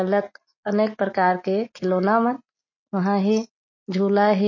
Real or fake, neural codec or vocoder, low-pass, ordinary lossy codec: real; none; 7.2 kHz; MP3, 32 kbps